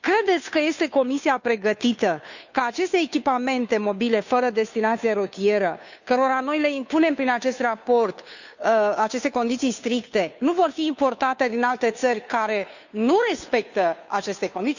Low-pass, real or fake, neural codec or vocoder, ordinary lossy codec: 7.2 kHz; fake; codec, 16 kHz, 2 kbps, FunCodec, trained on Chinese and English, 25 frames a second; none